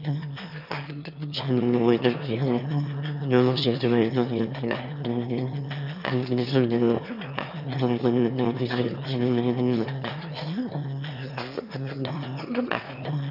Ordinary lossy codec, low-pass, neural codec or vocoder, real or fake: none; 5.4 kHz; autoencoder, 22.05 kHz, a latent of 192 numbers a frame, VITS, trained on one speaker; fake